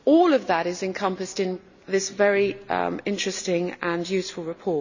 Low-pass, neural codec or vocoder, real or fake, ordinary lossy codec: 7.2 kHz; none; real; none